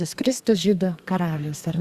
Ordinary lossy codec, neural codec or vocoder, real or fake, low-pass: Opus, 64 kbps; codec, 32 kHz, 1.9 kbps, SNAC; fake; 14.4 kHz